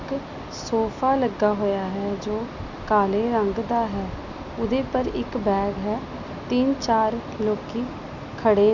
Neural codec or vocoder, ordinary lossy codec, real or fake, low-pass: none; none; real; 7.2 kHz